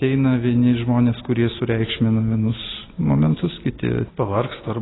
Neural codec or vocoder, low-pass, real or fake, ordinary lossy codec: none; 7.2 kHz; real; AAC, 16 kbps